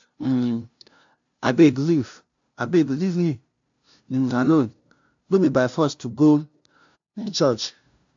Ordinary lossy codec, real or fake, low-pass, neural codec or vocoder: AAC, 64 kbps; fake; 7.2 kHz; codec, 16 kHz, 0.5 kbps, FunCodec, trained on LibriTTS, 25 frames a second